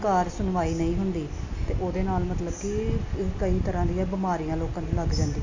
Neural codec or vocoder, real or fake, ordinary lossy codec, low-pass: none; real; AAC, 48 kbps; 7.2 kHz